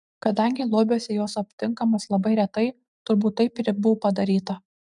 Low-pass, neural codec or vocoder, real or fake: 10.8 kHz; none; real